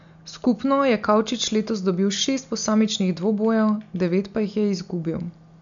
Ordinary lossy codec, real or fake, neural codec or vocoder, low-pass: MP3, 96 kbps; real; none; 7.2 kHz